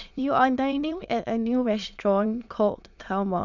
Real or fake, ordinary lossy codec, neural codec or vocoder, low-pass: fake; none; autoencoder, 22.05 kHz, a latent of 192 numbers a frame, VITS, trained on many speakers; 7.2 kHz